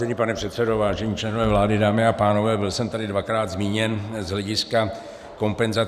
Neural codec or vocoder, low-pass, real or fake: vocoder, 44.1 kHz, 128 mel bands every 512 samples, BigVGAN v2; 14.4 kHz; fake